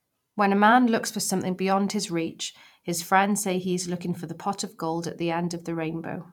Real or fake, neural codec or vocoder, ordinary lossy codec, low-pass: fake; vocoder, 44.1 kHz, 128 mel bands every 512 samples, BigVGAN v2; none; 19.8 kHz